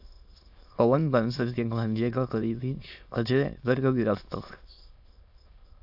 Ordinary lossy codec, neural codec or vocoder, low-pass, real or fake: AAC, 48 kbps; autoencoder, 22.05 kHz, a latent of 192 numbers a frame, VITS, trained on many speakers; 5.4 kHz; fake